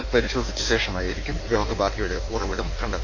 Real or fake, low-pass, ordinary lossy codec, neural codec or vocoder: fake; 7.2 kHz; none; codec, 16 kHz in and 24 kHz out, 1.1 kbps, FireRedTTS-2 codec